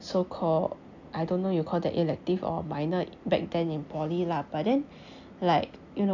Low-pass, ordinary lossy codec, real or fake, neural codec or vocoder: 7.2 kHz; none; real; none